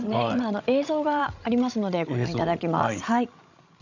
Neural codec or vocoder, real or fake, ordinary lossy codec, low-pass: codec, 16 kHz, 16 kbps, FreqCodec, larger model; fake; none; 7.2 kHz